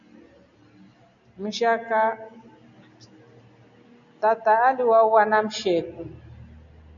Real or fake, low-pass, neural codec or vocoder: real; 7.2 kHz; none